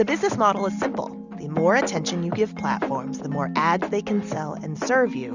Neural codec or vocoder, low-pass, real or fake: none; 7.2 kHz; real